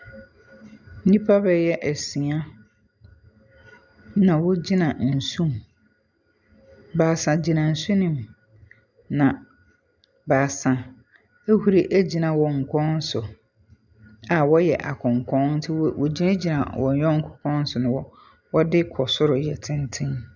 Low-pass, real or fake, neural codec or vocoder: 7.2 kHz; real; none